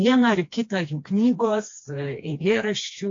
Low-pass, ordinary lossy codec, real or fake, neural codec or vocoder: 7.2 kHz; AAC, 48 kbps; fake; codec, 16 kHz, 2 kbps, FreqCodec, smaller model